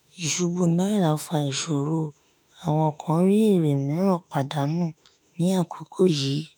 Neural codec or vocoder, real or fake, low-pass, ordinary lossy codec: autoencoder, 48 kHz, 32 numbers a frame, DAC-VAE, trained on Japanese speech; fake; none; none